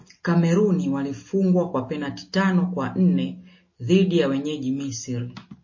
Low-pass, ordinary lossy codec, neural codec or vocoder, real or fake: 7.2 kHz; MP3, 32 kbps; none; real